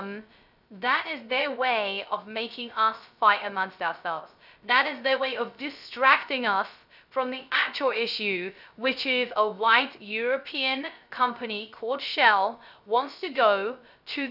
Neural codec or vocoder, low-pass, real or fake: codec, 16 kHz, 0.3 kbps, FocalCodec; 5.4 kHz; fake